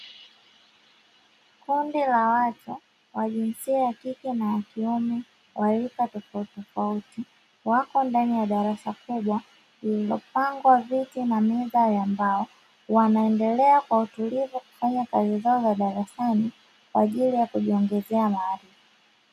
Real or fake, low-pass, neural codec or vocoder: real; 14.4 kHz; none